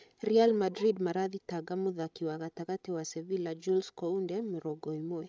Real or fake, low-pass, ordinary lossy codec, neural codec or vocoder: fake; none; none; codec, 16 kHz, 16 kbps, FreqCodec, larger model